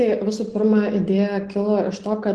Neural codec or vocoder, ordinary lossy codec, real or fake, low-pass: none; Opus, 16 kbps; real; 10.8 kHz